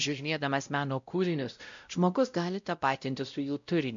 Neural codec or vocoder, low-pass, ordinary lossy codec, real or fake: codec, 16 kHz, 0.5 kbps, X-Codec, WavLM features, trained on Multilingual LibriSpeech; 7.2 kHz; MP3, 64 kbps; fake